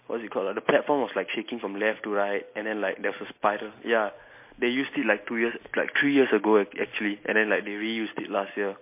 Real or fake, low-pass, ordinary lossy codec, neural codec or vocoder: real; 3.6 kHz; MP3, 24 kbps; none